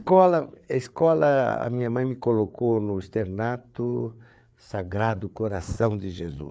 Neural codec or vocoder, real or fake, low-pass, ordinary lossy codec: codec, 16 kHz, 4 kbps, FreqCodec, larger model; fake; none; none